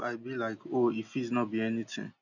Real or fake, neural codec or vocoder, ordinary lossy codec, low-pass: real; none; none; 7.2 kHz